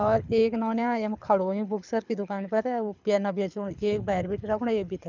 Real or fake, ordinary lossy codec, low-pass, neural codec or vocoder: fake; none; 7.2 kHz; codec, 24 kHz, 6 kbps, HILCodec